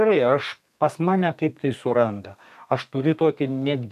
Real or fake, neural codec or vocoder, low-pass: fake; codec, 32 kHz, 1.9 kbps, SNAC; 14.4 kHz